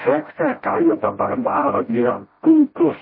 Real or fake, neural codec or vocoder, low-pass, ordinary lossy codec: fake; codec, 16 kHz, 0.5 kbps, FreqCodec, smaller model; 5.4 kHz; MP3, 24 kbps